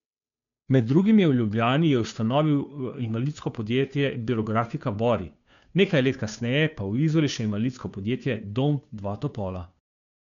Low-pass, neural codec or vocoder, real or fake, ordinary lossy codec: 7.2 kHz; codec, 16 kHz, 2 kbps, FunCodec, trained on Chinese and English, 25 frames a second; fake; MP3, 96 kbps